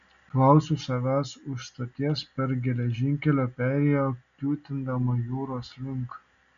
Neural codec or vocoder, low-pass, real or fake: none; 7.2 kHz; real